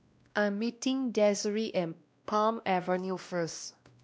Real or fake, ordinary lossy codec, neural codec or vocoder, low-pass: fake; none; codec, 16 kHz, 1 kbps, X-Codec, WavLM features, trained on Multilingual LibriSpeech; none